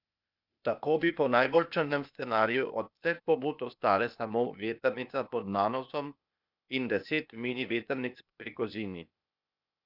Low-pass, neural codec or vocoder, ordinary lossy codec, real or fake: 5.4 kHz; codec, 16 kHz, 0.8 kbps, ZipCodec; none; fake